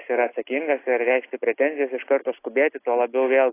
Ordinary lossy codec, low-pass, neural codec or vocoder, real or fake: AAC, 24 kbps; 3.6 kHz; none; real